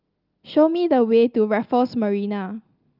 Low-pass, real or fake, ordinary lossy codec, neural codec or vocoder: 5.4 kHz; real; Opus, 24 kbps; none